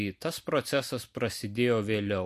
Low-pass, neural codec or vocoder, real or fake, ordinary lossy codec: 14.4 kHz; none; real; MP3, 64 kbps